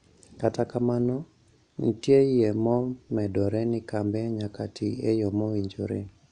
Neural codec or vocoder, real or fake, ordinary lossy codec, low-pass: none; real; none; 9.9 kHz